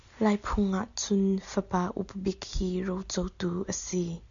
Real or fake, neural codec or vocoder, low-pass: real; none; 7.2 kHz